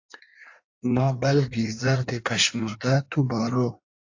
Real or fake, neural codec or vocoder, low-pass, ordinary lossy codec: fake; codec, 16 kHz in and 24 kHz out, 1.1 kbps, FireRedTTS-2 codec; 7.2 kHz; AAC, 48 kbps